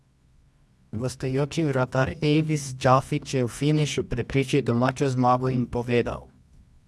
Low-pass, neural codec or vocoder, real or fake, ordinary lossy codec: none; codec, 24 kHz, 0.9 kbps, WavTokenizer, medium music audio release; fake; none